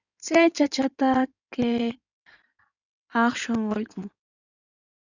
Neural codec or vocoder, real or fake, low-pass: codec, 16 kHz in and 24 kHz out, 2.2 kbps, FireRedTTS-2 codec; fake; 7.2 kHz